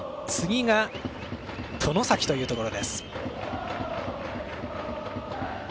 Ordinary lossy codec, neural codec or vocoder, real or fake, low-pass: none; none; real; none